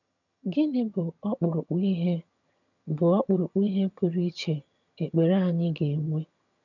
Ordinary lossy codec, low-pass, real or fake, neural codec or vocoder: none; 7.2 kHz; fake; vocoder, 22.05 kHz, 80 mel bands, HiFi-GAN